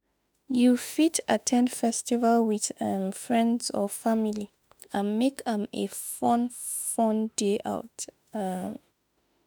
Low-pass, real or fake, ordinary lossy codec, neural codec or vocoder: none; fake; none; autoencoder, 48 kHz, 32 numbers a frame, DAC-VAE, trained on Japanese speech